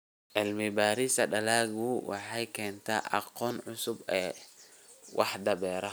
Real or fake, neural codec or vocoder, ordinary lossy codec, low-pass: real; none; none; none